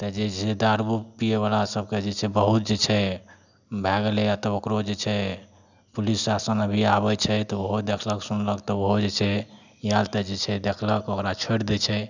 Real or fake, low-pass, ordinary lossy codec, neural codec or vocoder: real; 7.2 kHz; none; none